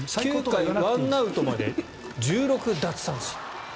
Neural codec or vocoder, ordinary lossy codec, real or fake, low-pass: none; none; real; none